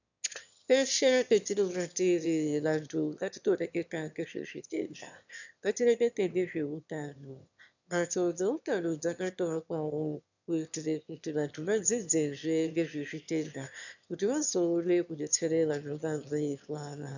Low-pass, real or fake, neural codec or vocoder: 7.2 kHz; fake; autoencoder, 22.05 kHz, a latent of 192 numbers a frame, VITS, trained on one speaker